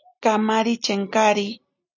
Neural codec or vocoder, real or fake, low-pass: none; real; 7.2 kHz